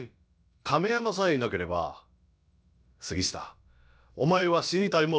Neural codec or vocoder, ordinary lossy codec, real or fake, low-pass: codec, 16 kHz, about 1 kbps, DyCAST, with the encoder's durations; none; fake; none